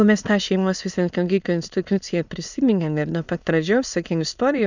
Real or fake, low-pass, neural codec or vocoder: fake; 7.2 kHz; autoencoder, 22.05 kHz, a latent of 192 numbers a frame, VITS, trained on many speakers